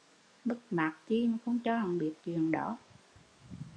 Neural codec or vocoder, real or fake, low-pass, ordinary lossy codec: codec, 44.1 kHz, 7.8 kbps, DAC; fake; 9.9 kHz; MP3, 96 kbps